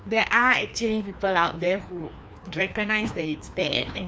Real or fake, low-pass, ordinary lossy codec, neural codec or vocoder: fake; none; none; codec, 16 kHz, 2 kbps, FreqCodec, larger model